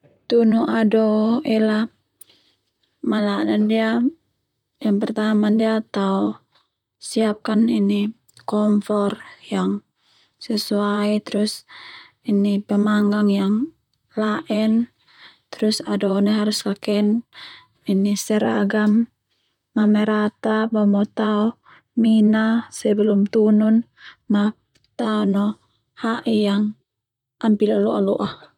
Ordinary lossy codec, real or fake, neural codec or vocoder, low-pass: none; fake; vocoder, 44.1 kHz, 128 mel bands, Pupu-Vocoder; 19.8 kHz